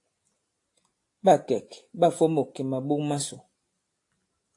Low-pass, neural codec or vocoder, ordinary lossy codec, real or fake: 10.8 kHz; none; AAC, 48 kbps; real